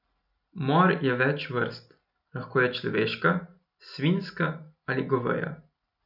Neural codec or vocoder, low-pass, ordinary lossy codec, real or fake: none; 5.4 kHz; none; real